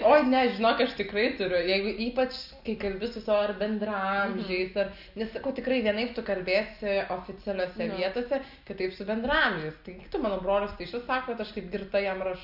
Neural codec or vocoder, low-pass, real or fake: none; 5.4 kHz; real